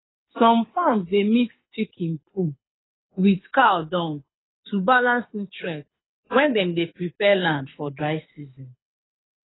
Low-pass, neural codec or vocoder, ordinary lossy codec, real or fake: 7.2 kHz; codec, 24 kHz, 6 kbps, HILCodec; AAC, 16 kbps; fake